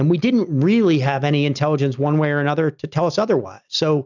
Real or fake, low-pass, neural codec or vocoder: real; 7.2 kHz; none